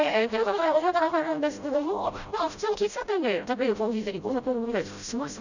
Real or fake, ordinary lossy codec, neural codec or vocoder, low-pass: fake; none; codec, 16 kHz, 0.5 kbps, FreqCodec, smaller model; 7.2 kHz